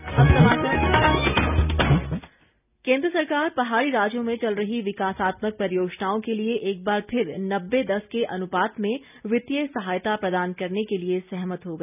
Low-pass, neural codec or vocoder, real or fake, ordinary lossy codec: 3.6 kHz; none; real; none